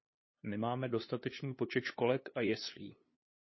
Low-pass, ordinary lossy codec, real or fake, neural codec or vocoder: 7.2 kHz; MP3, 24 kbps; fake; codec, 16 kHz, 2 kbps, FunCodec, trained on LibriTTS, 25 frames a second